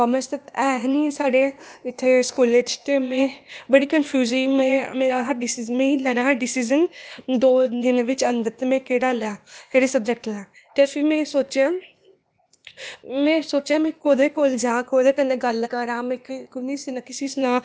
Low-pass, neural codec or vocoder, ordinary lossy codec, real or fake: none; codec, 16 kHz, 0.8 kbps, ZipCodec; none; fake